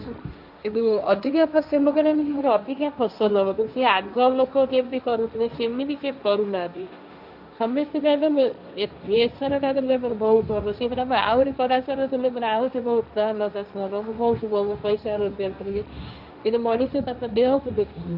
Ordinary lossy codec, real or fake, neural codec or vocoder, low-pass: none; fake; codec, 16 kHz, 1.1 kbps, Voila-Tokenizer; 5.4 kHz